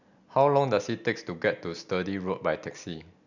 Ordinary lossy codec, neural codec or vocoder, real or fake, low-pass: none; none; real; 7.2 kHz